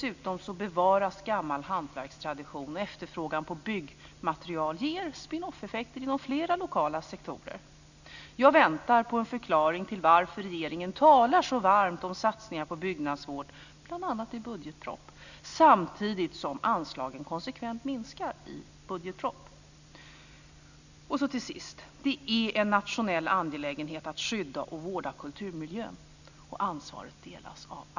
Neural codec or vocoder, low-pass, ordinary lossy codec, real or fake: none; 7.2 kHz; none; real